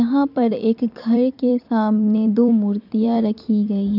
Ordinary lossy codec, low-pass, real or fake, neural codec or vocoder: none; 5.4 kHz; fake; vocoder, 44.1 kHz, 128 mel bands every 256 samples, BigVGAN v2